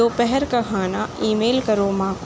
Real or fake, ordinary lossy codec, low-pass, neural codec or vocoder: real; none; none; none